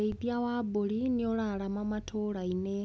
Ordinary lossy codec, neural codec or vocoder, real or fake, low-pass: none; none; real; none